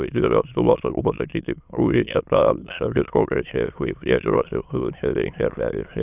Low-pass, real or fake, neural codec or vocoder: 3.6 kHz; fake; autoencoder, 22.05 kHz, a latent of 192 numbers a frame, VITS, trained on many speakers